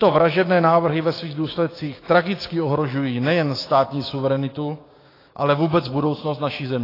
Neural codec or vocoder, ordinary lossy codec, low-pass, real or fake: codec, 16 kHz, 6 kbps, DAC; AAC, 24 kbps; 5.4 kHz; fake